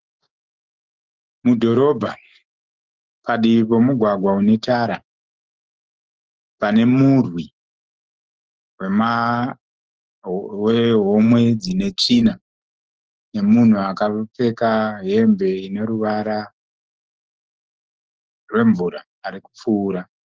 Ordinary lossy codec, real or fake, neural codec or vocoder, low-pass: Opus, 16 kbps; fake; autoencoder, 48 kHz, 128 numbers a frame, DAC-VAE, trained on Japanese speech; 7.2 kHz